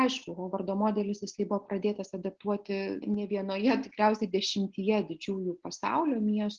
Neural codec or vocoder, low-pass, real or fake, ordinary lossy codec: none; 7.2 kHz; real; Opus, 16 kbps